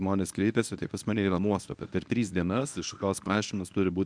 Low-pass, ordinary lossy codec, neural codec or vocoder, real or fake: 9.9 kHz; MP3, 96 kbps; codec, 24 kHz, 0.9 kbps, WavTokenizer, medium speech release version 1; fake